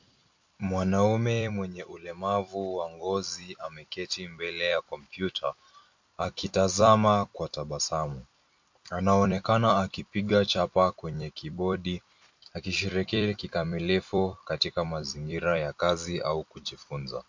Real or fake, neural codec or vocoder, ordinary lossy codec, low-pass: fake; vocoder, 44.1 kHz, 128 mel bands every 256 samples, BigVGAN v2; MP3, 48 kbps; 7.2 kHz